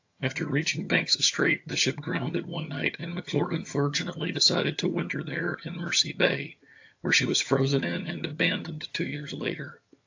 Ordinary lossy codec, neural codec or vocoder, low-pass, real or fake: AAC, 48 kbps; vocoder, 22.05 kHz, 80 mel bands, HiFi-GAN; 7.2 kHz; fake